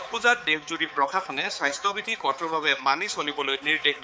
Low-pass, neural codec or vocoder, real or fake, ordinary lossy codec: none; codec, 16 kHz, 4 kbps, X-Codec, HuBERT features, trained on balanced general audio; fake; none